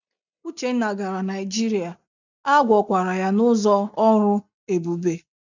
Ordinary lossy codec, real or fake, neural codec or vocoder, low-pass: none; real; none; 7.2 kHz